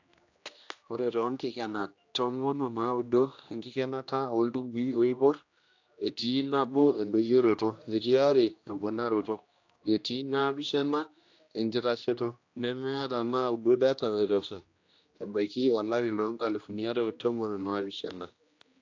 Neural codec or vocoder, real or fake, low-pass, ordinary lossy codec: codec, 16 kHz, 1 kbps, X-Codec, HuBERT features, trained on general audio; fake; 7.2 kHz; AAC, 48 kbps